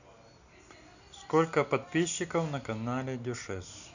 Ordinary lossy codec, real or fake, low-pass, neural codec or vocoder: none; real; 7.2 kHz; none